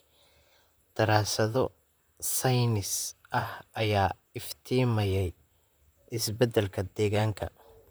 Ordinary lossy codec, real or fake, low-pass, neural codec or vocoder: none; fake; none; vocoder, 44.1 kHz, 128 mel bands, Pupu-Vocoder